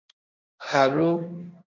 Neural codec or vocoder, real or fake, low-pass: codec, 16 kHz, 1.1 kbps, Voila-Tokenizer; fake; 7.2 kHz